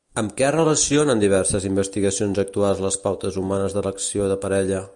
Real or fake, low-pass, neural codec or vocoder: real; 10.8 kHz; none